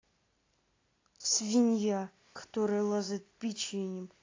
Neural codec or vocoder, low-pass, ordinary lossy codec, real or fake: none; 7.2 kHz; AAC, 32 kbps; real